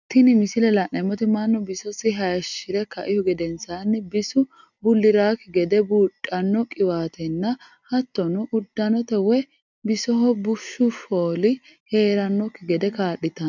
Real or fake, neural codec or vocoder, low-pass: real; none; 7.2 kHz